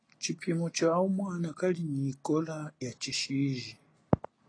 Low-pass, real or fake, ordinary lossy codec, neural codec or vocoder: 9.9 kHz; real; AAC, 32 kbps; none